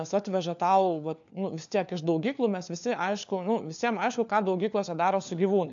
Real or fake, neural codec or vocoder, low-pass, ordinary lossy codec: fake; codec, 16 kHz, 4 kbps, FunCodec, trained on LibriTTS, 50 frames a second; 7.2 kHz; AAC, 64 kbps